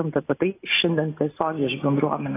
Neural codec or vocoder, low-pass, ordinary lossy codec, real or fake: none; 3.6 kHz; AAC, 16 kbps; real